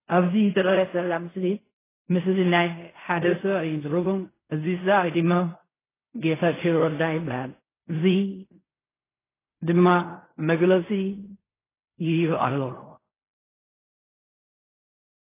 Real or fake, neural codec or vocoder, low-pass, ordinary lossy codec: fake; codec, 16 kHz in and 24 kHz out, 0.4 kbps, LongCat-Audio-Codec, fine tuned four codebook decoder; 3.6 kHz; AAC, 16 kbps